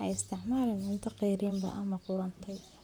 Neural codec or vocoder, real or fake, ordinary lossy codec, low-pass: vocoder, 44.1 kHz, 128 mel bands, Pupu-Vocoder; fake; none; none